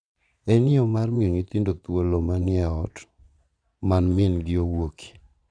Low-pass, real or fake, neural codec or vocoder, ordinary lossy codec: 9.9 kHz; fake; vocoder, 22.05 kHz, 80 mel bands, Vocos; none